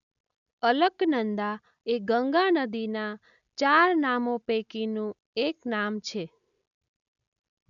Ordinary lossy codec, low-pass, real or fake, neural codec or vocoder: none; 7.2 kHz; real; none